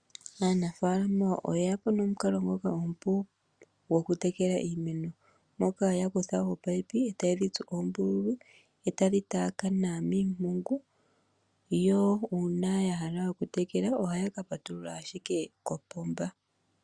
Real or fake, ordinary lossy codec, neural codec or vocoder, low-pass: real; Opus, 64 kbps; none; 9.9 kHz